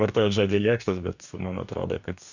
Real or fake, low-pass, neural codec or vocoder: fake; 7.2 kHz; codec, 44.1 kHz, 2.6 kbps, DAC